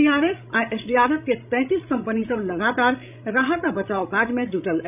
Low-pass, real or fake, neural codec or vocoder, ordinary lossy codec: 3.6 kHz; fake; codec, 16 kHz, 16 kbps, FreqCodec, larger model; none